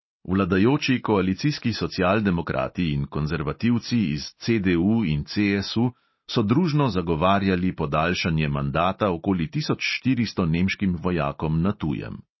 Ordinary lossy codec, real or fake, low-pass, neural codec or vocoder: MP3, 24 kbps; real; 7.2 kHz; none